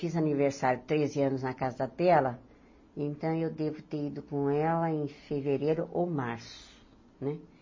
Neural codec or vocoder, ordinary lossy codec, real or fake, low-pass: none; MP3, 32 kbps; real; 7.2 kHz